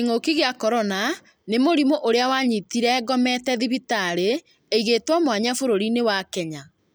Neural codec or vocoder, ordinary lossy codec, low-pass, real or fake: none; none; none; real